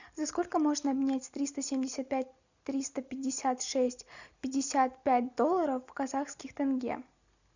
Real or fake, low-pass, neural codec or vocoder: real; 7.2 kHz; none